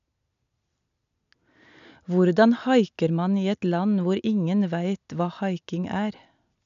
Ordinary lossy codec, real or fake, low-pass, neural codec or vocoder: none; real; 7.2 kHz; none